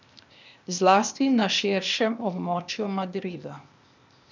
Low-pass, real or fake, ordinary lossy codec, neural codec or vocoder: 7.2 kHz; fake; none; codec, 16 kHz, 0.8 kbps, ZipCodec